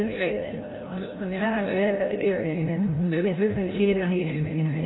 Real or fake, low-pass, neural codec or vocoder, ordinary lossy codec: fake; 7.2 kHz; codec, 16 kHz, 0.5 kbps, FreqCodec, larger model; AAC, 16 kbps